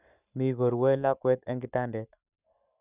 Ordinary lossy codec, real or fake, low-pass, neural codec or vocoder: none; fake; 3.6 kHz; codec, 44.1 kHz, 7.8 kbps, Pupu-Codec